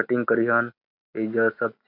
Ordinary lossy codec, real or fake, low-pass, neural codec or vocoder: none; real; 5.4 kHz; none